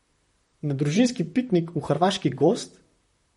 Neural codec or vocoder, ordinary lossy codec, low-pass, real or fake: vocoder, 44.1 kHz, 128 mel bands, Pupu-Vocoder; MP3, 48 kbps; 19.8 kHz; fake